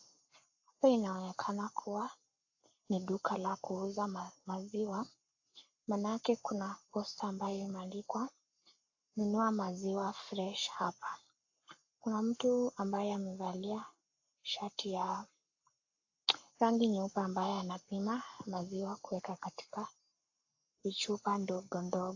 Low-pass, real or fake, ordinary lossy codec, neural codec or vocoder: 7.2 kHz; fake; AAC, 48 kbps; codec, 44.1 kHz, 7.8 kbps, Pupu-Codec